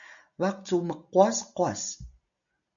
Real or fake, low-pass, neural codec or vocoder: real; 7.2 kHz; none